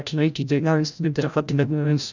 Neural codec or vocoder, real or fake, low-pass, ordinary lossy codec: codec, 16 kHz, 0.5 kbps, FreqCodec, larger model; fake; 7.2 kHz; none